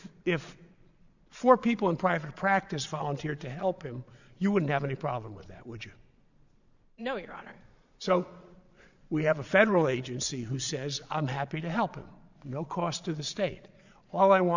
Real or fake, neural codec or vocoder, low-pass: fake; vocoder, 22.05 kHz, 80 mel bands, Vocos; 7.2 kHz